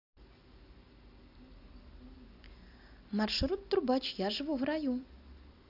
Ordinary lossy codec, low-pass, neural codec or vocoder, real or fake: AAC, 48 kbps; 5.4 kHz; none; real